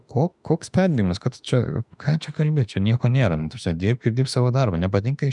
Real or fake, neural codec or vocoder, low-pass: fake; autoencoder, 48 kHz, 32 numbers a frame, DAC-VAE, trained on Japanese speech; 10.8 kHz